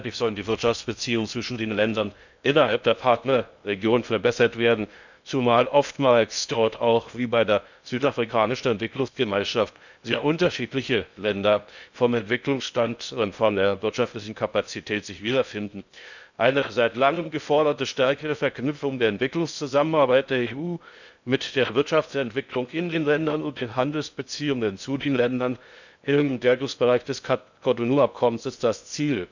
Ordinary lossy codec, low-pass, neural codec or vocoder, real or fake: none; 7.2 kHz; codec, 16 kHz in and 24 kHz out, 0.6 kbps, FocalCodec, streaming, 2048 codes; fake